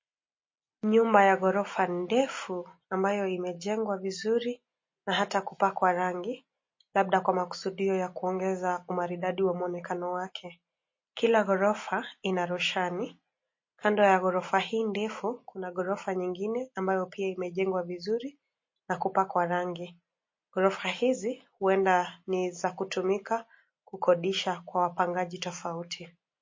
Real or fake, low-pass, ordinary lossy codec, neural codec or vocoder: real; 7.2 kHz; MP3, 32 kbps; none